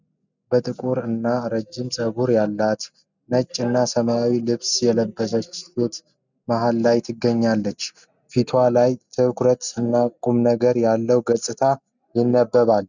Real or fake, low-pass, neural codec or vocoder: real; 7.2 kHz; none